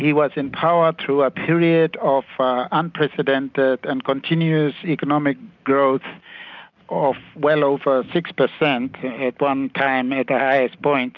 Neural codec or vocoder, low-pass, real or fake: none; 7.2 kHz; real